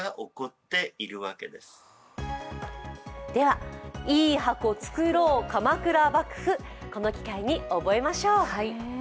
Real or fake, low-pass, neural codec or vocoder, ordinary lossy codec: real; none; none; none